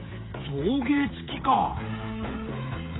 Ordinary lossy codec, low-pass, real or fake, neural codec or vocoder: AAC, 16 kbps; 7.2 kHz; fake; codec, 16 kHz, 8 kbps, FreqCodec, smaller model